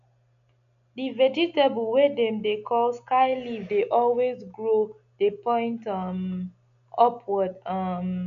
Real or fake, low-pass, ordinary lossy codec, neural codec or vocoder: real; 7.2 kHz; none; none